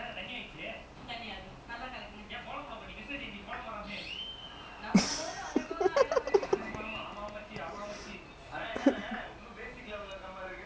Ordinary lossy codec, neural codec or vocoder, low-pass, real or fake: none; none; none; real